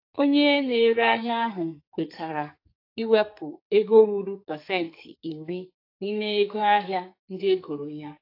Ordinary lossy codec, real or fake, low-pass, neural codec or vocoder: AAC, 32 kbps; fake; 5.4 kHz; codec, 44.1 kHz, 2.6 kbps, SNAC